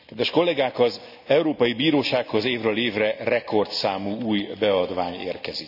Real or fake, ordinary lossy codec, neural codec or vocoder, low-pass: real; none; none; 5.4 kHz